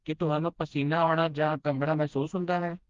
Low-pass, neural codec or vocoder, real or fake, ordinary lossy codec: 7.2 kHz; codec, 16 kHz, 1 kbps, FreqCodec, smaller model; fake; Opus, 32 kbps